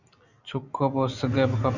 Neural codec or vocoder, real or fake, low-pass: none; real; 7.2 kHz